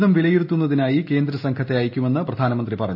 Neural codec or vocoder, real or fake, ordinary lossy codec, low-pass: none; real; AAC, 48 kbps; 5.4 kHz